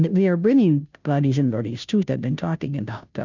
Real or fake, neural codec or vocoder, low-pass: fake; codec, 16 kHz, 0.5 kbps, FunCodec, trained on Chinese and English, 25 frames a second; 7.2 kHz